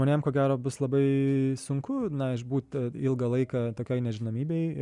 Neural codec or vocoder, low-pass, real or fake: none; 10.8 kHz; real